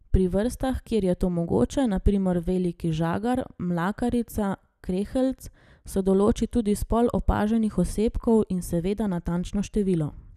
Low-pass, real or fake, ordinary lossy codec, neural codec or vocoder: 14.4 kHz; real; none; none